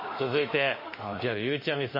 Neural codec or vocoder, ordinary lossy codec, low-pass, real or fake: autoencoder, 48 kHz, 32 numbers a frame, DAC-VAE, trained on Japanese speech; MP3, 24 kbps; 5.4 kHz; fake